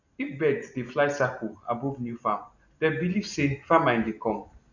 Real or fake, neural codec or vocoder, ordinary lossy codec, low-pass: real; none; none; 7.2 kHz